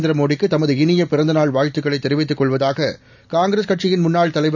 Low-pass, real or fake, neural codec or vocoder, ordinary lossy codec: 7.2 kHz; real; none; none